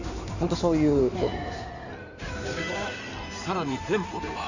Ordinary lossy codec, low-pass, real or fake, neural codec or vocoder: none; 7.2 kHz; fake; codec, 16 kHz in and 24 kHz out, 2.2 kbps, FireRedTTS-2 codec